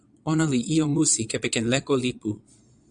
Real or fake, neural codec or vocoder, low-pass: fake; vocoder, 22.05 kHz, 80 mel bands, Vocos; 9.9 kHz